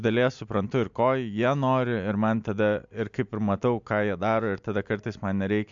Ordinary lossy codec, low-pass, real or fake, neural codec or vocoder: MP3, 64 kbps; 7.2 kHz; real; none